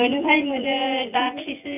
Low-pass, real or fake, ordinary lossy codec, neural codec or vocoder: 3.6 kHz; fake; none; vocoder, 24 kHz, 100 mel bands, Vocos